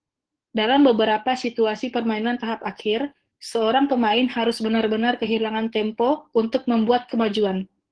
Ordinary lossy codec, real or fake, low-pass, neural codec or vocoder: Opus, 16 kbps; fake; 9.9 kHz; codec, 44.1 kHz, 7.8 kbps, DAC